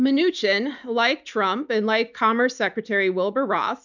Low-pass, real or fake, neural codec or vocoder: 7.2 kHz; real; none